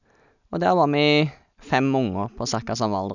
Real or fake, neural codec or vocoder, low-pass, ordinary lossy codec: real; none; 7.2 kHz; none